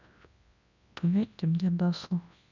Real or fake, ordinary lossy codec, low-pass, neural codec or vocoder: fake; none; 7.2 kHz; codec, 24 kHz, 0.9 kbps, WavTokenizer, large speech release